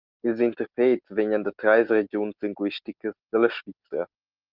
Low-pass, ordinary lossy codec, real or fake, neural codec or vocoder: 5.4 kHz; Opus, 32 kbps; real; none